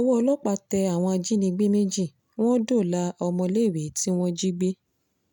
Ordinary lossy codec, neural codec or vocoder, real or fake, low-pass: none; none; real; 19.8 kHz